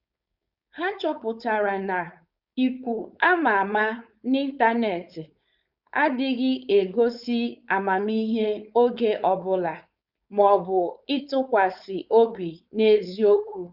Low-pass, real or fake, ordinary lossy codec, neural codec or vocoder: 5.4 kHz; fake; Opus, 64 kbps; codec, 16 kHz, 4.8 kbps, FACodec